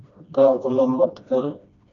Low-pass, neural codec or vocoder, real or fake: 7.2 kHz; codec, 16 kHz, 1 kbps, FreqCodec, smaller model; fake